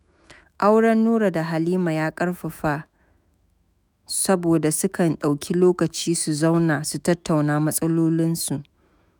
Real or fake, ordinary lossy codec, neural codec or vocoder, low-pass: fake; none; autoencoder, 48 kHz, 128 numbers a frame, DAC-VAE, trained on Japanese speech; none